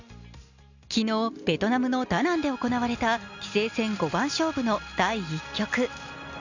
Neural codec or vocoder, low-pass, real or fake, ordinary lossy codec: none; 7.2 kHz; real; none